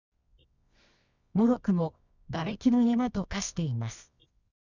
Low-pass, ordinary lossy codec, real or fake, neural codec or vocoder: 7.2 kHz; none; fake; codec, 24 kHz, 0.9 kbps, WavTokenizer, medium music audio release